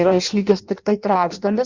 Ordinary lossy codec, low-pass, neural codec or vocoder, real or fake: Opus, 64 kbps; 7.2 kHz; codec, 16 kHz in and 24 kHz out, 0.6 kbps, FireRedTTS-2 codec; fake